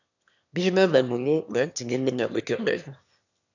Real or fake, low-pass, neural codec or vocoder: fake; 7.2 kHz; autoencoder, 22.05 kHz, a latent of 192 numbers a frame, VITS, trained on one speaker